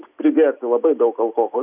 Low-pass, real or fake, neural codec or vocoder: 3.6 kHz; real; none